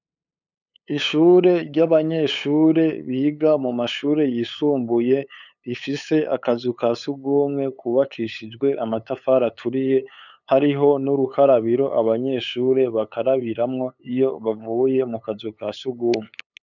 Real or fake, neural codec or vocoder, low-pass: fake; codec, 16 kHz, 8 kbps, FunCodec, trained on LibriTTS, 25 frames a second; 7.2 kHz